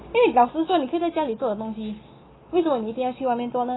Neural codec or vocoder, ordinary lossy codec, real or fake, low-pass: none; AAC, 16 kbps; real; 7.2 kHz